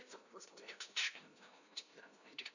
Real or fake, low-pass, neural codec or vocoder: fake; 7.2 kHz; codec, 16 kHz, 0.5 kbps, FunCodec, trained on LibriTTS, 25 frames a second